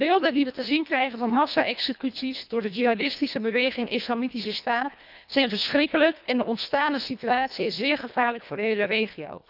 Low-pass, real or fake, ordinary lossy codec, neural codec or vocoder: 5.4 kHz; fake; none; codec, 24 kHz, 1.5 kbps, HILCodec